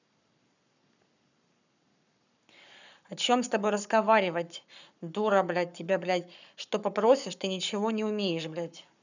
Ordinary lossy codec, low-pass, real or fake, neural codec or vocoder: none; 7.2 kHz; fake; codec, 44.1 kHz, 7.8 kbps, Pupu-Codec